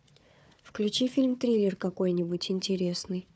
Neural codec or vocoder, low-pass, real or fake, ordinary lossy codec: codec, 16 kHz, 4 kbps, FunCodec, trained on Chinese and English, 50 frames a second; none; fake; none